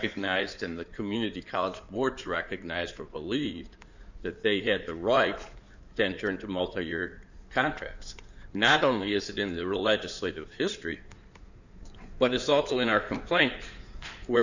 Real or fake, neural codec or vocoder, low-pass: fake; codec, 16 kHz in and 24 kHz out, 2.2 kbps, FireRedTTS-2 codec; 7.2 kHz